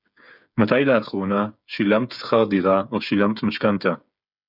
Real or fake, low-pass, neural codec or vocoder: fake; 5.4 kHz; codec, 16 kHz, 8 kbps, FreqCodec, smaller model